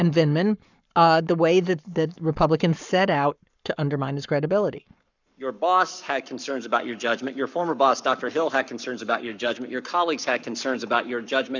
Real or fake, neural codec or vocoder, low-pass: fake; codec, 44.1 kHz, 7.8 kbps, Pupu-Codec; 7.2 kHz